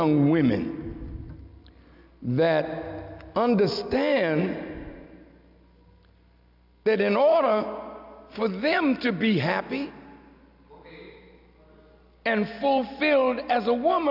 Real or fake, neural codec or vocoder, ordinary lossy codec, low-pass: real; none; AAC, 48 kbps; 5.4 kHz